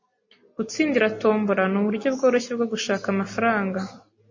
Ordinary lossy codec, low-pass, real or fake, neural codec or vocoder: MP3, 32 kbps; 7.2 kHz; real; none